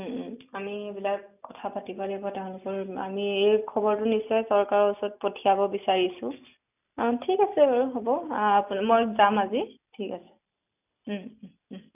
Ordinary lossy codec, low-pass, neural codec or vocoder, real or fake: none; 3.6 kHz; none; real